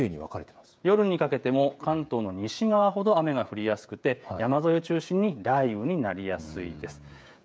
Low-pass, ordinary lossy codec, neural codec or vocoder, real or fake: none; none; codec, 16 kHz, 16 kbps, FreqCodec, smaller model; fake